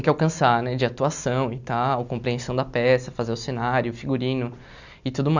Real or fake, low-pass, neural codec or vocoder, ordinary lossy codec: real; 7.2 kHz; none; none